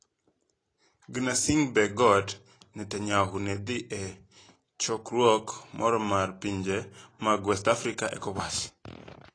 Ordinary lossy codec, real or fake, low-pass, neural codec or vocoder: AAC, 32 kbps; real; 9.9 kHz; none